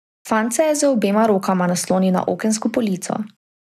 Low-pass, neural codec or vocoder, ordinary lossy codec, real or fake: 14.4 kHz; none; none; real